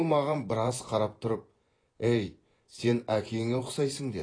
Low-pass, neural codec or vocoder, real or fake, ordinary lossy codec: 9.9 kHz; vocoder, 24 kHz, 100 mel bands, Vocos; fake; AAC, 32 kbps